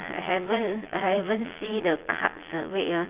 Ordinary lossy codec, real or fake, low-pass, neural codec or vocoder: Opus, 32 kbps; fake; 3.6 kHz; vocoder, 22.05 kHz, 80 mel bands, Vocos